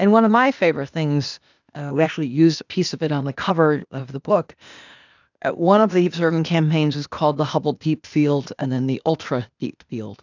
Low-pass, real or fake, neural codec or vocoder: 7.2 kHz; fake; codec, 16 kHz, 0.8 kbps, ZipCodec